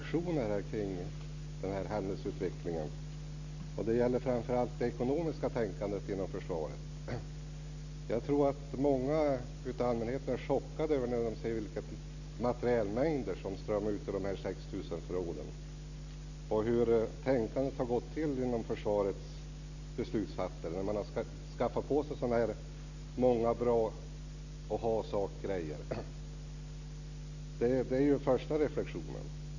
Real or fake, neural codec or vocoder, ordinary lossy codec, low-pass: real; none; none; 7.2 kHz